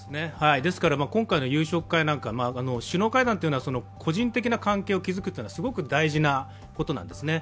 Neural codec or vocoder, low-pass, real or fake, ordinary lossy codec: none; none; real; none